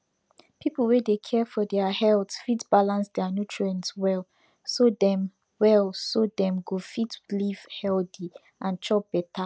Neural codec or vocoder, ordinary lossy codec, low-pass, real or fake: none; none; none; real